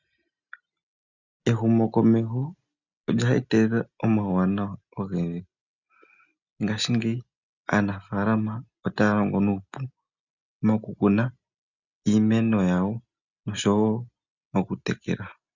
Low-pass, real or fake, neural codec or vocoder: 7.2 kHz; real; none